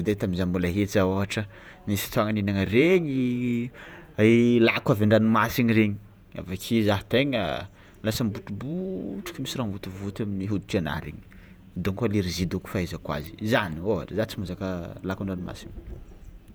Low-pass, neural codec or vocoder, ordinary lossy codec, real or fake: none; vocoder, 48 kHz, 128 mel bands, Vocos; none; fake